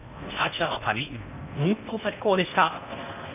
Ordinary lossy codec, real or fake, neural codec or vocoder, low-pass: none; fake; codec, 16 kHz in and 24 kHz out, 0.6 kbps, FocalCodec, streaming, 4096 codes; 3.6 kHz